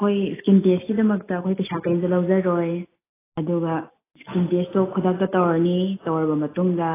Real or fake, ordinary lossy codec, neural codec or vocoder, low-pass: real; AAC, 16 kbps; none; 3.6 kHz